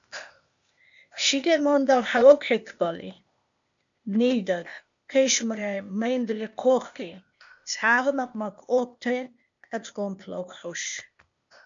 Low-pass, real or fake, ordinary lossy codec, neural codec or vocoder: 7.2 kHz; fake; MP3, 64 kbps; codec, 16 kHz, 0.8 kbps, ZipCodec